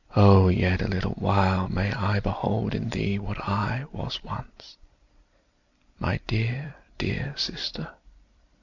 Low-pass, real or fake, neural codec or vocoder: 7.2 kHz; fake; vocoder, 44.1 kHz, 128 mel bands every 256 samples, BigVGAN v2